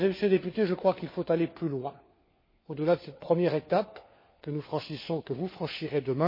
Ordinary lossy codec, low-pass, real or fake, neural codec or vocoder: MP3, 24 kbps; 5.4 kHz; fake; codec, 16 kHz, 4 kbps, FunCodec, trained on LibriTTS, 50 frames a second